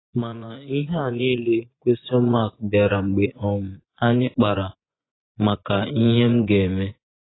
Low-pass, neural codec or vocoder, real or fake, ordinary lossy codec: 7.2 kHz; vocoder, 24 kHz, 100 mel bands, Vocos; fake; AAC, 16 kbps